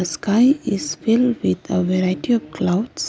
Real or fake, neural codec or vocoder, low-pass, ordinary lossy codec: real; none; none; none